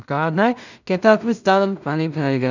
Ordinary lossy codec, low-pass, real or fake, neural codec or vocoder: none; 7.2 kHz; fake; codec, 16 kHz in and 24 kHz out, 0.4 kbps, LongCat-Audio-Codec, two codebook decoder